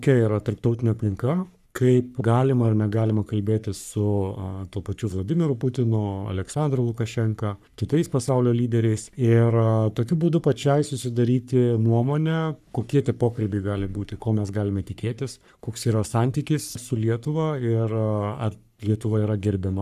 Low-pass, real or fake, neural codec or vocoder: 14.4 kHz; fake; codec, 44.1 kHz, 3.4 kbps, Pupu-Codec